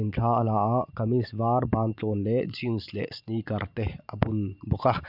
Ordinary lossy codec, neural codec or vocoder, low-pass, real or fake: none; vocoder, 44.1 kHz, 128 mel bands every 512 samples, BigVGAN v2; 5.4 kHz; fake